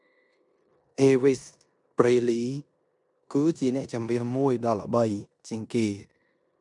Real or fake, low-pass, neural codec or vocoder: fake; 10.8 kHz; codec, 16 kHz in and 24 kHz out, 0.9 kbps, LongCat-Audio-Codec, four codebook decoder